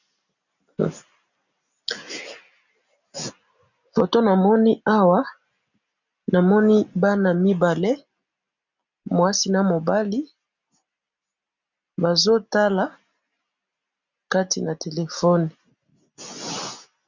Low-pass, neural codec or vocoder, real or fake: 7.2 kHz; none; real